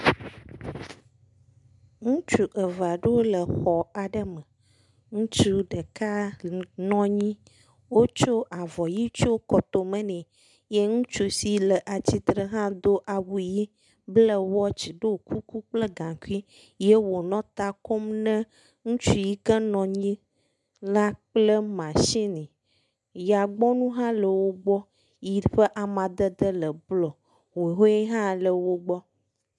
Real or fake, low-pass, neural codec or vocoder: real; 10.8 kHz; none